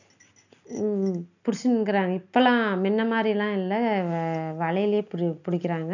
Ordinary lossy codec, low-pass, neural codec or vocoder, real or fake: none; 7.2 kHz; none; real